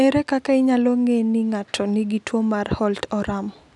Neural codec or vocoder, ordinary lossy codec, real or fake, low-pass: none; none; real; 10.8 kHz